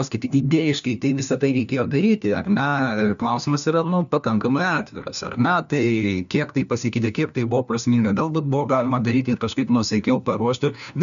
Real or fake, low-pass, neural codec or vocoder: fake; 7.2 kHz; codec, 16 kHz, 1 kbps, FunCodec, trained on LibriTTS, 50 frames a second